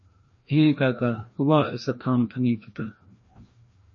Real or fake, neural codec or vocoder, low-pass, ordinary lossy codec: fake; codec, 16 kHz, 1 kbps, FreqCodec, larger model; 7.2 kHz; MP3, 32 kbps